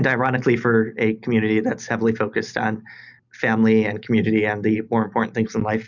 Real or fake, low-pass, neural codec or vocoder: real; 7.2 kHz; none